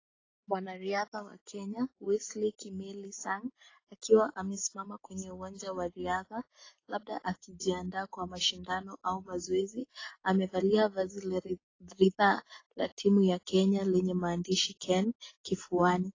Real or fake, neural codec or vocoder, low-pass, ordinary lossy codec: real; none; 7.2 kHz; AAC, 32 kbps